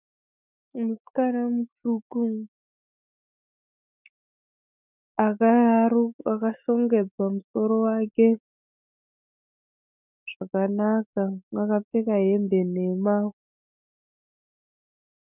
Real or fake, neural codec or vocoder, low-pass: fake; autoencoder, 48 kHz, 128 numbers a frame, DAC-VAE, trained on Japanese speech; 3.6 kHz